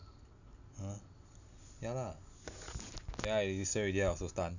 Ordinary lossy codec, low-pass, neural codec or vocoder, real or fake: none; 7.2 kHz; none; real